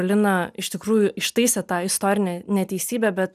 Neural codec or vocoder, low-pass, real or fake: none; 14.4 kHz; real